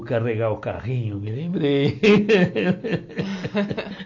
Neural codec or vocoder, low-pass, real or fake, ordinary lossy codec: none; 7.2 kHz; real; AAC, 48 kbps